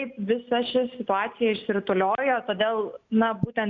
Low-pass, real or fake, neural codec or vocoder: 7.2 kHz; real; none